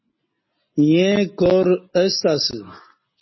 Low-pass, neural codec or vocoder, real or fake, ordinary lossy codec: 7.2 kHz; none; real; MP3, 24 kbps